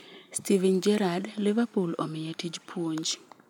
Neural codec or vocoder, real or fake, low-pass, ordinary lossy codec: none; real; 19.8 kHz; none